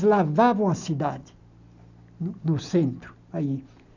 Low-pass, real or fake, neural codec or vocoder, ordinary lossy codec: 7.2 kHz; real; none; none